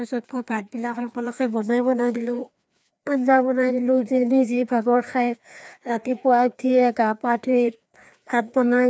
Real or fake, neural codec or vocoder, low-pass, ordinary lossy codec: fake; codec, 16 kHz, 2 kbps, FreqCodec, larger model; none; none